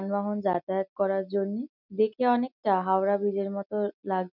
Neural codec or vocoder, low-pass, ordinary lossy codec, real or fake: none; 5.4 kHz; none; real